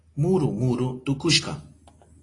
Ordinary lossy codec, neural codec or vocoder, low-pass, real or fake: AAC, 32 kbps; none; 10.8 kHz; real